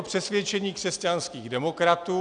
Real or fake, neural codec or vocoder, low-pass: real; none; 9.9 kHz